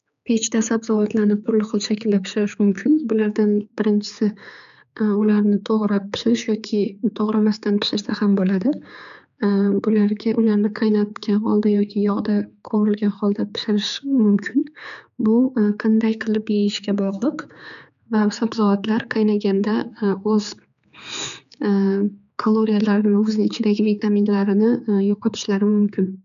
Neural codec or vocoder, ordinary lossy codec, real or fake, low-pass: codec, 16 kHz, 4 kbps, X-Codec, HuBERT features, trained on general audio; none; fake; 7.2 kHz